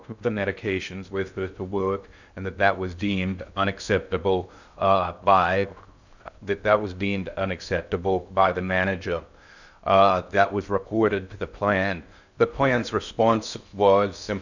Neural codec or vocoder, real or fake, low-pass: codec, 16 kHz in and 24 kHz out, 0.6 kbps, FocalCodec, streaming, 2048 codes; fake; 7.2 kHz